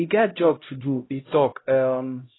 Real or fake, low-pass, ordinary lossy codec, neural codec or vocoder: fake; 7.2 kHz; AAC, 16 kbps; codec, 16 kHz, 0.5 kbps, X-Codec, HuBERT features, trained on LibriSpeech